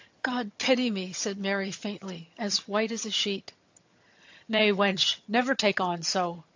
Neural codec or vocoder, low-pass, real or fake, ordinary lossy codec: vocoder, 22.05 kHz, 80 mel bands, HiFi-GAN; 7.2 kHz; fake; AAC, 48 kbps